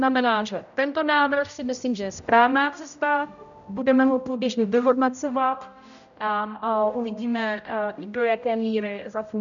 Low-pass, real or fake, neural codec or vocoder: 7.2 kHz; fake; codec, 16 kHz, 0.5 kbps, X-Codec, HuBERT features, trained on general audio